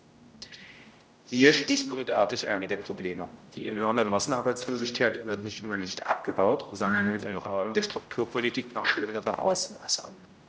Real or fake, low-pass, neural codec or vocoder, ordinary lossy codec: fake; none; codec, 16 kHz, 0.5 kbps, X-Codec, HuBERT features, trained on general audio; none